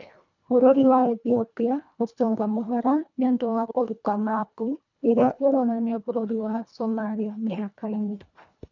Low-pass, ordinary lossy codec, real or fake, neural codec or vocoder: 7.2 kHz; none; fake; codec, 24 kHz, 1.5 kbps, HILCodec